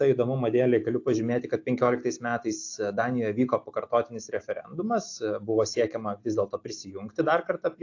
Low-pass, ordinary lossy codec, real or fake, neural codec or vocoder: 7.2 kHz; AAC, 48 kbps; real; none